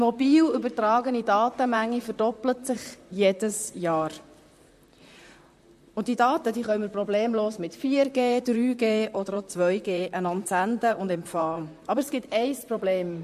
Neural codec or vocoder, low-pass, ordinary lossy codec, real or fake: vocoder, 44.1 kHz, 128 mel bands, Pupu-Vocoder; 14.4 kHz; MP3, 64 kbps; fake